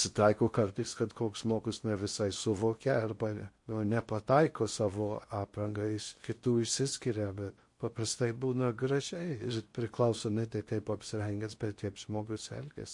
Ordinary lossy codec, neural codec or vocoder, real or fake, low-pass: MP3, 48 kbps; codec, 16 kHz in and 24 kHz out, 0.8 kbps, FocalCodec, streaming, 65536 codes; fake; 10.8 kHz